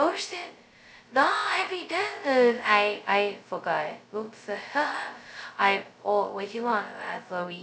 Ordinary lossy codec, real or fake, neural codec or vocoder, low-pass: none; fake; codec, 16 kHz, 0.2 kbps, FocalCodec; none